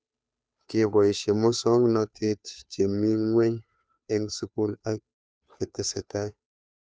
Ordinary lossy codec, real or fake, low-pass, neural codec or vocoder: none; fake; none; codec, 16 kHz, 2 kbps, FunCodec, trained on Chinese and English, 25 frames a second